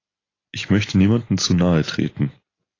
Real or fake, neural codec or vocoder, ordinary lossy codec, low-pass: real; none; AAC, 32 kbps; 7.2 kHz